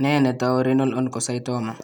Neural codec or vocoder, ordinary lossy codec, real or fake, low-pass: none; none; real; 19.8 kHz